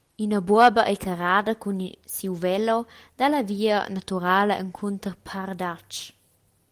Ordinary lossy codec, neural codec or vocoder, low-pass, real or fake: Opus, 24 kbps; none; 14.4 kHz; real